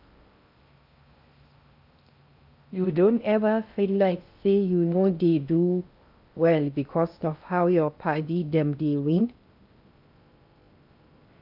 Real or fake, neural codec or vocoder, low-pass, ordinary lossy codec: fake; codec, 16 kHz in and 24 kHz out, 0.6 kbps, FocalCodec, streaming, 4096 codes; 5.4 kHz; AAC, 48 kbps